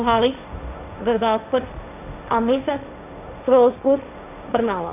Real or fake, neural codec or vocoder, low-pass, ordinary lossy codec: fake; codec, 16 kHz, 1.1 kbps, Voila-Tokenizer; 3.6 kHz; AAC, 32 kbps